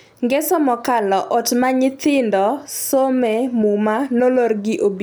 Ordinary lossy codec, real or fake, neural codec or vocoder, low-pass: none; real; none; none